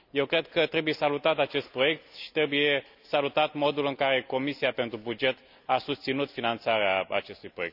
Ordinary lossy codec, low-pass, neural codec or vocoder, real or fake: none; 5.4 kHz; none; real